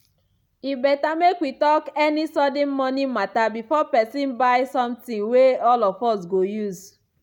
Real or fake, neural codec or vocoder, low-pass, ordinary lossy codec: real; none; 19.8 kHz; none